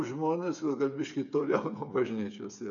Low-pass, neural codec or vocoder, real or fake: 7.2 kHz; codec, 16 kHz, 8 kbps, FreqCodec, smaller model; fake